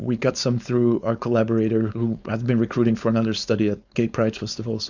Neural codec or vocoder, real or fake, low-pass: codec, 16 kHz, 4.8 kbps, FACodec; fake; 7.2 kHz